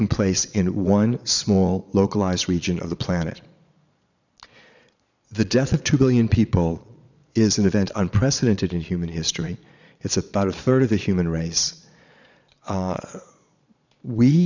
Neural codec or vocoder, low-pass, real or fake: vocoder, 22.05 kHz, 80 mel bands, Vocos; 7.2 kHz; fake